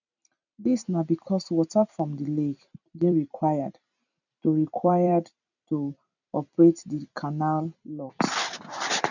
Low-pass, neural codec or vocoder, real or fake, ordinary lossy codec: 7.2 kHz; vocoder, 44.1 kHz, 128 mel bands every 256 samples, BigVGAN v2; fake; none